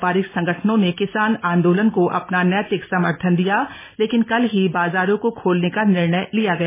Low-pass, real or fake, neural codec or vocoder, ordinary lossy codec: 3.6 kHz; real; none; MP3, 16 kbps